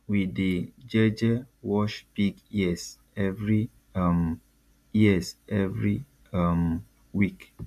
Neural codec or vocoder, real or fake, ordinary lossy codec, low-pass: none; real; none; 14.4 kHz